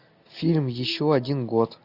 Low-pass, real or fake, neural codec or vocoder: 5.4 kHz; real; none